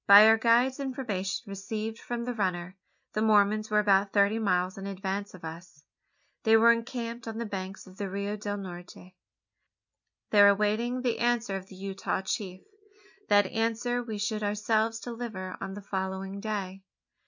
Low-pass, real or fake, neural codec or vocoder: 7.2 kHz; real; none